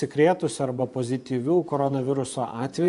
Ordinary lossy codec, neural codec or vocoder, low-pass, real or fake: AAC, 64 kbps; vocoder, 24 kHz, 100 mel bands, Vocos; 10.8 kHz; fake